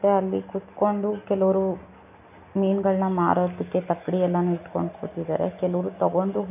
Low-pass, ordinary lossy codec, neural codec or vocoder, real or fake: 3.6 kHz; none; none; real